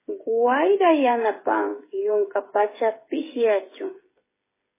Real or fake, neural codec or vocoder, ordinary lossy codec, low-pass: fake; codec, 16 kHz, 8 kbps, FreqCodec, smaller model; MP3, 16 kbps; 3.6 kHz